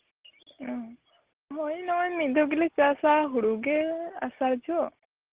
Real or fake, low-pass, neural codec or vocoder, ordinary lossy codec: real; 3.6 kHz; none; Opus, 16 kbps